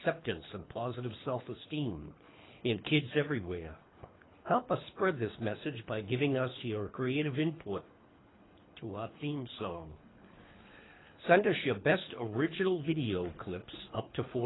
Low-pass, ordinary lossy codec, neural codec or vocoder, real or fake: 7.2 kHz; AAC, 16 kbps; codec, 24 kHz, 3 kbps, HILCodec; fake